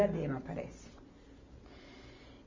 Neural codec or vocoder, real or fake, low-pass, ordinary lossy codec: none; real; 7.2 kHz; MP3, 32 kbps